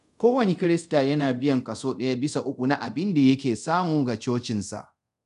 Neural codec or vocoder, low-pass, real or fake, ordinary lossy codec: codec, 24 kHz, 0.5 kbps, DualCodec; 10.8 kHz; fake; none